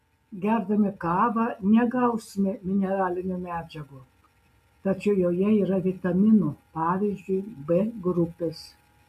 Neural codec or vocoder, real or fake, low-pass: none; real; 14.4 kHz